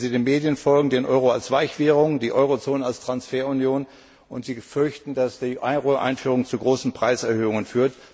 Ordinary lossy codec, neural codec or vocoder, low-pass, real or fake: none; none; none; real